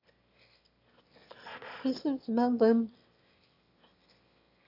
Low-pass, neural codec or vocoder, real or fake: 5.4 kHz; autoencoder, 22.05 kHz, a latent of 192 numbers a frame, VITS, trained on one speaker; fake